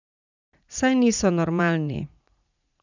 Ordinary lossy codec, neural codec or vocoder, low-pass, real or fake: none; vocoder, 44.1 kHz, 80 mel bands, Vocos; 7.2 kHz; fake